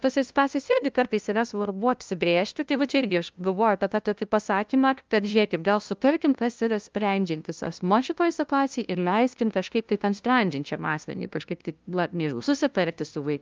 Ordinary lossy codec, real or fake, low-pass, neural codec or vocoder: Opus, 24 kbps; fake; 7.2 kHz; codec, 16 kHz, 0.5 kbps, FunCodec, trained on LibriTTS, 25 frames a second